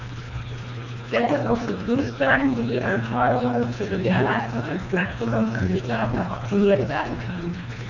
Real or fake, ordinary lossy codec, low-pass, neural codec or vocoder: fake; none; 7.2 kHz; codec, 24 kHz, 1.5 kbps, HILCodec